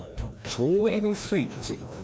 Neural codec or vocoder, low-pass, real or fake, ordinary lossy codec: codec, 16 kHz, 1 kbps, FreqCodec, larger model; none; fake; none